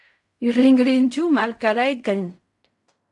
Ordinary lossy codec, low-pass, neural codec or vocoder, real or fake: AAC, 64 kbps; 10.8 kHz; codec, 16 kHz in and 24 kHz out, 0.4 kbps, LongCat-Audio-Codec, fine tuned four codebook decoder; fake